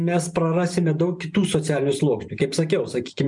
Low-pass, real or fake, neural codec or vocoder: 10.8 kHz; fake; vocoder, 44.1 kHz, 128 mel bands every 512 samples, BigVGAN v2